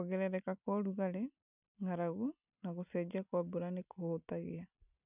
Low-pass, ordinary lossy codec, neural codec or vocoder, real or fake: 3.6 kHz; none; none; real